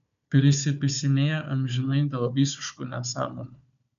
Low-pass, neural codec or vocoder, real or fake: 7.2 kHz; codec, 16 kHz, 4 kbps, FunCodec, trained on Chinese and English, 50 frames a second; fake